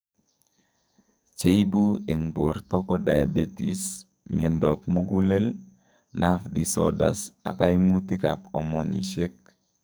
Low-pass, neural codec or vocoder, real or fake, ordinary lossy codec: none; codec, 44.1 kHz, 2.6 kbps, SNAC; fake; none